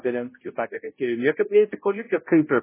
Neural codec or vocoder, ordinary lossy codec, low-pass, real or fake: codec, 16 kHz, 0.5 kbps, X-Codec, HuBERT features, trained on balanced general audio; MP3, 16 kbps; 3.6 kHz; fake